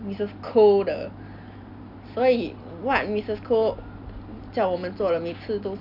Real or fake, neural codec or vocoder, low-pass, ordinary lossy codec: real; none; 5.4 kHz; none